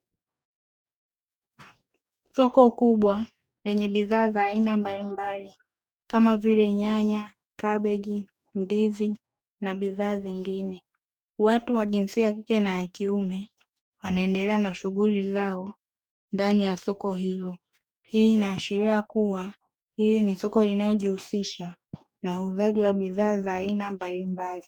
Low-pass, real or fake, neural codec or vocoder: 19.8 kHz; fake; codec, 44.1 kHz, 2.6 kbps, DAC